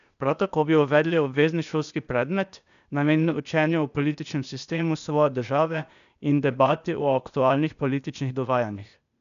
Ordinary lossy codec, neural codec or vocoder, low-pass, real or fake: none; codec, 16 kHz, 0.8 kbps, ZipCodec; 7.2 kHz; fake